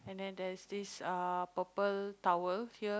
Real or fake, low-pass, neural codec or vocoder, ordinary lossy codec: real; none; none; none